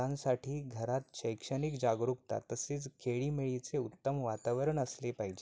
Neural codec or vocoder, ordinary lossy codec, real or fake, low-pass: none; none; real; none